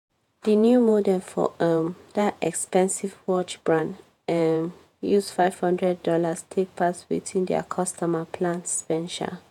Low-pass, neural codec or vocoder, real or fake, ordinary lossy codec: 19.8 kHz; vocoder, 48 kHz, 128 mel bands, Vocos; fake; none